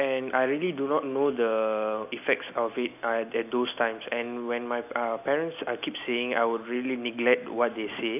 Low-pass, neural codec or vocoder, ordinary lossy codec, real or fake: 3.6 kHz; none; none; real